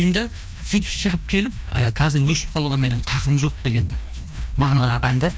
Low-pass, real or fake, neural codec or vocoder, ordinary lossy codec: none; fake; codec, 16 kHz, 1 kbps, FreqCodec, larger model; none